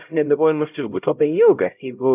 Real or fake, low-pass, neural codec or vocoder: fake; 3.6 kHz; codec, 16 kHz, 0.5 kbps, X-Codec, HuBERT features, trained on LibriSpeech